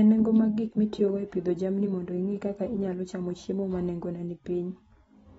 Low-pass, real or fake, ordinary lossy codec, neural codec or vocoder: 19.8 kHz; real; AAC, 24 kbps; none